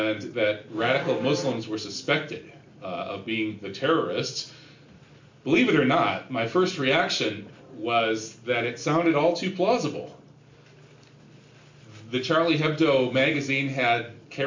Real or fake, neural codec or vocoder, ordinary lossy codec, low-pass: real; none; MP3, 48 kbps; 7.2 kHz